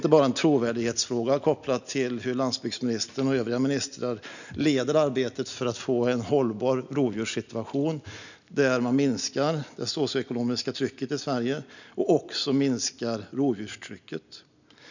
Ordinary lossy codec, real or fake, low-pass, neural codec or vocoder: none; real; 7.2 kHz; none